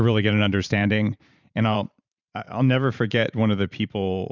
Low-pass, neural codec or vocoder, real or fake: 7.2 kHz; vocoder, 44.1 kHz, 128 mel bands every 512 samples, BigVGAN v2; fake